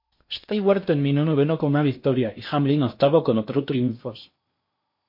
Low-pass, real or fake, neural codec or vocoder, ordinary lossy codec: 5.4 kHz; fake; codec, 16 kHz in and 24 kHz out, 0.8 kbps, FocalCodec, streaming, 65536 codes; MP3, 32 kbps